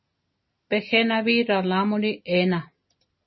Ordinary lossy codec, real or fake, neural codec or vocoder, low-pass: MP3, 24 kbps; real; none; 7.2 kHz